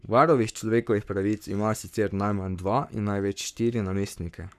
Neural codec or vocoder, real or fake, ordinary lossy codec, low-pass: codec, 44.1 kHz, 7.8 kbps, Pupu-Codec; fake; none; 14.4 kHz